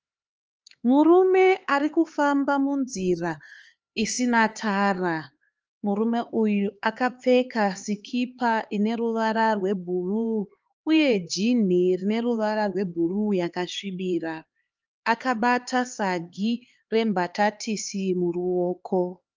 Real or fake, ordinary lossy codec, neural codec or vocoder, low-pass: fake; Opus, 24 kbps; codec, 16 kHz, 4 kbps, X-Codec, HuBERT features, trained on LibriSpeech; 7.2 kHz